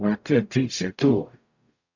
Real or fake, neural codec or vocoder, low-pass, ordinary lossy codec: fake; codec, 44.1 kHz, 0.9 kbps, DAC; 7.2 kHz; AAC, 48 kbps